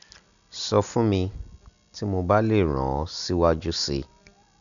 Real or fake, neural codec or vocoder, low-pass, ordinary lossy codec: real; none; 7.2 kHz; none